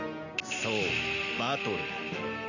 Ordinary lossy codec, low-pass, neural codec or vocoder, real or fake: none; 7.2 kHz; none; real